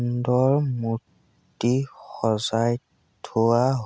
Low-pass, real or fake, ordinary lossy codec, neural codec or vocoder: none; real; none; none